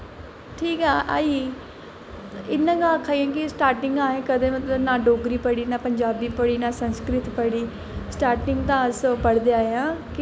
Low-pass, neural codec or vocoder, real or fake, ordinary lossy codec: none; none; real; none